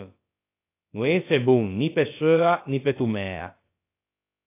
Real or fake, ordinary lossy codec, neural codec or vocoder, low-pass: fake; AAC, 24 kbps; codec, 16 kHz, about 1 kbps, DyCAST, with the encoder's durations; 3.6 kHz